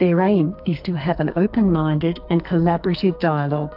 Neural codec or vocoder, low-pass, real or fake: codec, 44.1 kHz, 2.6 kbps, SNAC; 5.4 kHz; fake